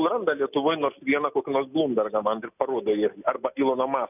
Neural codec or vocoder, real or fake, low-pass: none; real; 3.6 kHz